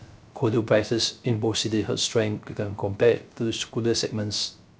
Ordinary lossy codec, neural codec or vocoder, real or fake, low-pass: none; codec, 16 kHz, 0.3 kbps, FocalCodec; fake; none